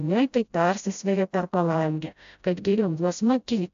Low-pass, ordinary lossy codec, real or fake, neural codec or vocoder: 7.2 kHz; AAC, 96 kbps; fake; codec, 16 kHz, 0.5 kbps, FreqCodec, smaller model